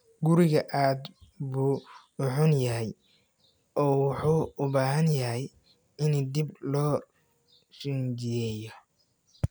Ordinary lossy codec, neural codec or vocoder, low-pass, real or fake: none; none; none; real